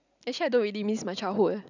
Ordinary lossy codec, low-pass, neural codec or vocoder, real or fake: none; 7.2 kHz; none; real